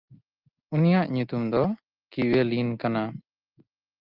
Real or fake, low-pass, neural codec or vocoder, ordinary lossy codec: real; 5.4 kHz; none; Opus, 24 kbps